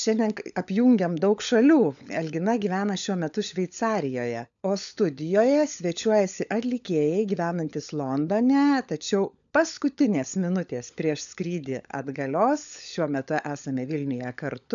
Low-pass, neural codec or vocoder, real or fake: 7.2 kHz; codec, 16 kHz, 16 kbps, FunCodec, trained on LibriTTS, 50 frames a second; fake